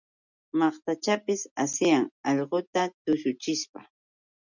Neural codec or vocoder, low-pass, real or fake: none; 7.2 kHz; real